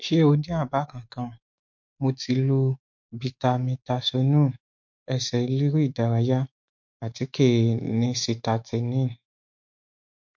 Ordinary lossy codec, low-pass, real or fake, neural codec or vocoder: MP3, 48 kbps; 7.2 kHz; fake; autoencoder, 48 kHz, 128 numbers a frame, DAC-VAE, trained on Japanese speech